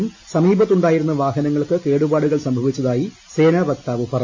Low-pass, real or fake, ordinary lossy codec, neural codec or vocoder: 7.2 kHz; real; MP3, 32 kbps; none